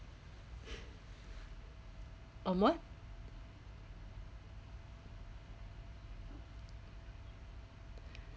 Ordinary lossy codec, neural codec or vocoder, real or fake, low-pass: none; none; real; none